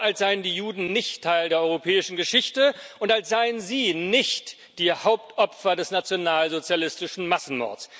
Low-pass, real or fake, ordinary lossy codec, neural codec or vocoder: none; real; none; none